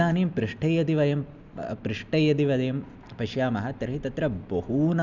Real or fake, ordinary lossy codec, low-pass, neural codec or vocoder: real; none; 7.2 kHz; none